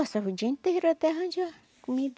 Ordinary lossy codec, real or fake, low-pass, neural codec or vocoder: none; real; none; none